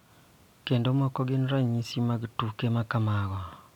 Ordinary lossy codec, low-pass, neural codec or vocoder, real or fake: none; 19.8 kHz; none; real